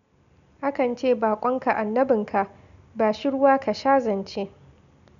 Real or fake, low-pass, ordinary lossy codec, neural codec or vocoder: real; 7.2 kHz; none; none